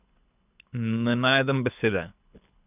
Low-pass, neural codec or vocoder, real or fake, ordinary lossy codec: 3.6 kHz; codec, 24 kHz, 6 kbps, HILCodec; fake; none